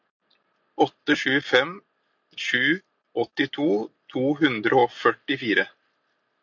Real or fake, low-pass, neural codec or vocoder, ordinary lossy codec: real; 7.2 kHz; none; MP3, 48 kbps